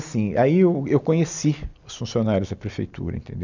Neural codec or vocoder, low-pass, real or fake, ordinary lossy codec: none; 7.2 kHz; real; none